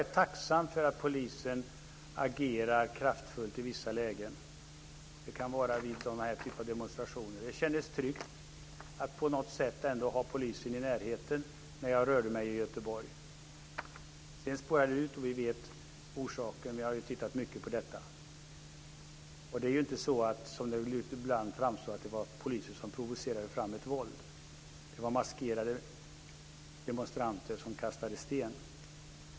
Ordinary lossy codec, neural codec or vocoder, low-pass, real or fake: none; none; none; real